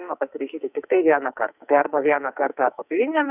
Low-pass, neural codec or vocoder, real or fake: 3.6 kHz; codec, 44.1 kHz, 2.6 kbps, SNAC; fake